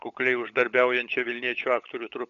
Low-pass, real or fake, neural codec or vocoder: 7.2 kHz; fake; codec, 16 kHz, 16 kbps, FunCodec, trained on LibriTTS, 50 frames a second